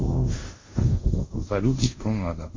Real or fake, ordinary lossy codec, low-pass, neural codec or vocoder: fake; MP3, 32 kbps; 7.2 kHz; codec, 24 kHz, 0.5 kbps, DualCodec